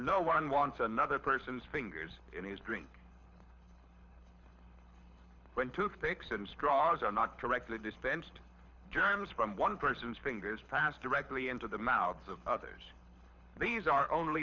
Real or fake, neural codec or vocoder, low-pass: fake; codec, 24 kHz, 6 kbps, HILCodec; 7.2 kHz